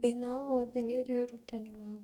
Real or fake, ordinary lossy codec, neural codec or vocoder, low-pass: fake; none; codec, 44.1 kHz, 2.6 kbps, DAC; 19.8 kHz